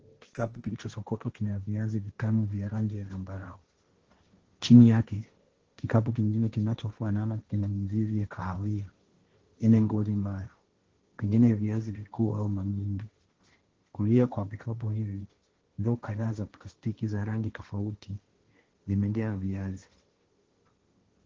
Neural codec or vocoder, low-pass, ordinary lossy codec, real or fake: codec, 16 kHz, 1.1 kbps, Voila-Tokenizer; 7.2 kHz; Opus, 16 kbps; fake